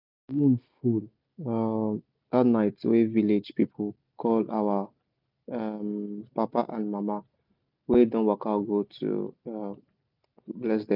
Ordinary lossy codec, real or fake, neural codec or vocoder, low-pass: none; real; none; 5.4 kHz